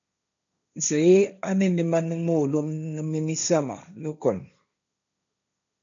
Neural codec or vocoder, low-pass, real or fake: codec, 16 kHz, 1.1 kbps, Voila-Tokenizer; 7.2 kHz; fake